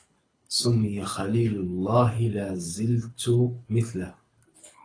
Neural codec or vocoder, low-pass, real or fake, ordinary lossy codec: codec, 24 kHz, 6 kbps, HILCodec; 9.9 kHz; fake; AAC, 32 kbps